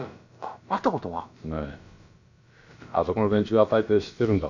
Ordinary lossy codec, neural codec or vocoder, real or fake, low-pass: none; codec, 16 kHz, about 1 kbps, DyCAST, with the encoder's durations; fake; 7.2 kHz